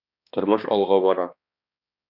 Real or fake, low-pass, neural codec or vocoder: fake; 5.4 kHz; codec, 16 kHz, 2 kbps, X-Codec, HuBERT features, trained on balanced general audio